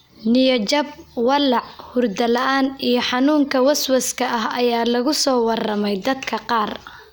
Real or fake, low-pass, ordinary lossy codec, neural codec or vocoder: real; none; none; none